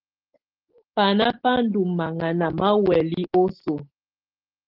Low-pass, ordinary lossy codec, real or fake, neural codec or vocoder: 5.4 kHz; Opus, 16 kbps; real; none